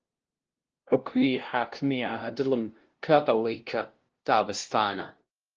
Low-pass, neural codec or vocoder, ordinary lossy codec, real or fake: 7.2 kHz; codec, 16 kHz, 0.5 kbps, FunCodec, trained on LibriTTS, 25 frames a second; Opus, 16 kbps; fake